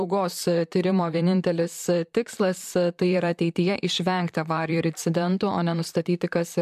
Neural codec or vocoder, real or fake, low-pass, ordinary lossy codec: vocoder, 44.1 kHz, 128 mel bands, Pupu-Vocoder; fake; 14.4 kHz; MP3, 96 kbps